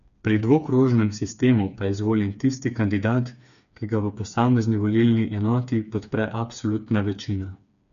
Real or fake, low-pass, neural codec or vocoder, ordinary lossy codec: fake; 7.2 kHz; codec, 16 kHz, 4 kbps, FreqCodec, smaller model; none